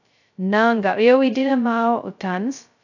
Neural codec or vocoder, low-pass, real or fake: codec, 16 kHz, 0.2 kbps, FocalCodec; 7.2 kHz; fake